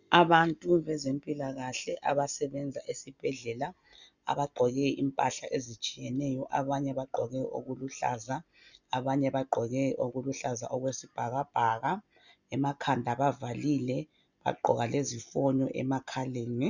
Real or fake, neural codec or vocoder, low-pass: real; none; 7.2 kHz